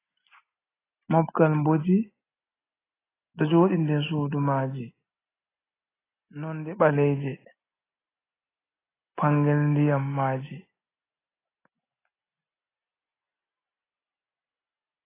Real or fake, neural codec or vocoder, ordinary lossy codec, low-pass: real; none; AAC, 16 kbps; 3.6 kHz